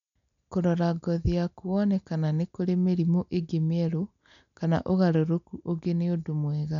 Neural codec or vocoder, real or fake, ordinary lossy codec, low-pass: none; real; none; 7.2 kHz